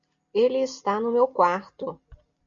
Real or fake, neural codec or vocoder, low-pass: real; none; 7.2 kHz